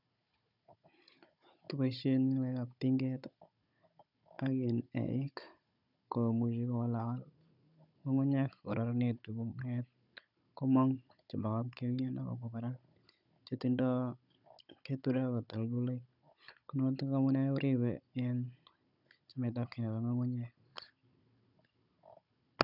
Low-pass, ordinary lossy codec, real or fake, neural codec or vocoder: 5.4 kHz; none; fake; codec, 16 kHz, 16 kbps, FunCodec, trained on Chinese and English, 50 frames a second